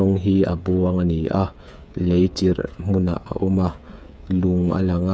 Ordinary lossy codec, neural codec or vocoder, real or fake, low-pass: none; codec, 16 kHz, 8 kbps, FreqCodec, smaller model; fake; none